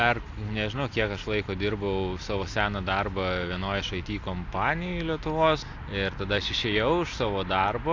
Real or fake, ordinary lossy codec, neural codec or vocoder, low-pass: real; AAC, 48 kbps; none; 7.2 kHz